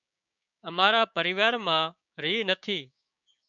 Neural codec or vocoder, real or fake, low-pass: codec, 16 kHz, 6 kbps, DAC; fake; 7.2 kHz